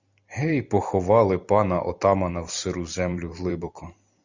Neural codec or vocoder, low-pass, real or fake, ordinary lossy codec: vocoder, 44.1 kHz, 128 mel bands every 256 samples, BigVGAN v2; 7.2 kHz; fake; Opus, 64 kbps